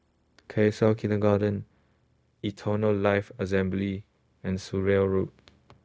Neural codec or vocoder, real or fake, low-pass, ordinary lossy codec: codec, 16 kHz, 0.4 kbps, LongCat-Audio-Codec; fake; none; none